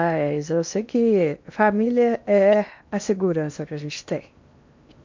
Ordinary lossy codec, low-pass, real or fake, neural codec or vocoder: MP3, 48 kbps; 7.2 kHz; fake; codec, 16 kHz in and 24 kHz out, 0.8 kbps, FocalCodec, streaming, 65536 codes